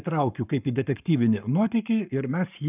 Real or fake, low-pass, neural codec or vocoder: fake; 3.6 kHz; codec, 44.1 kHz, 7.8 kbps, Pupu-Codec